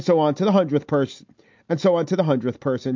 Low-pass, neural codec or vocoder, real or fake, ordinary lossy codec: 7.2 kHz; none; real; MP3, 48 kbps